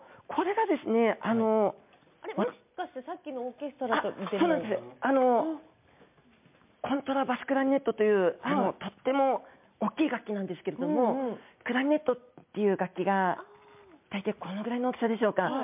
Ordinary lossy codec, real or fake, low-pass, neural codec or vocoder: MP3, 32 kbps; real; 3.6 kHz; none